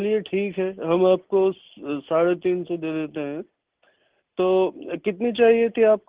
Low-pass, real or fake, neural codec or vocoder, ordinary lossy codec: 3.6 kHz; real; none; Opus, 16 kbps